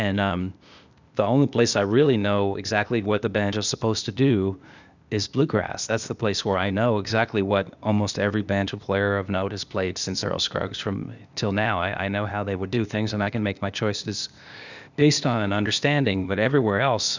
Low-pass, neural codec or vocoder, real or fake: 7.2 kHz; codec, 16 kHz, 0.8 kbps, ZipCodec; fake